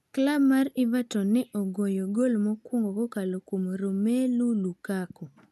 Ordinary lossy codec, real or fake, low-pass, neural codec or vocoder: none; real; 14.4 kHz; none